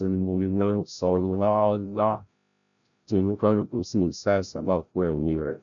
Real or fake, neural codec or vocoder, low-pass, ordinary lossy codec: fake; codec, 16 kHz, 0.5 kbps, FreqCodec, larger model; 7.2 kHz; none